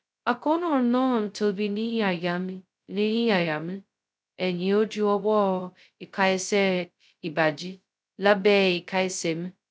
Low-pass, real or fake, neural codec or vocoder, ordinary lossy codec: none; fake; codec, 16 kHz, 0.2 kbps, FocalCodec; none